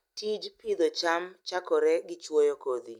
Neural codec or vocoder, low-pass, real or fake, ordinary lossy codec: none; 19.8 kHz; real; none